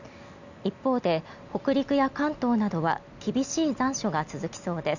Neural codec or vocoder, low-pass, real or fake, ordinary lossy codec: none; 7.2 kHz; real; none